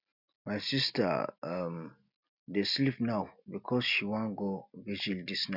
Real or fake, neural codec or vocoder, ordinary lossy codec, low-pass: real; none; none; 5.4 kHz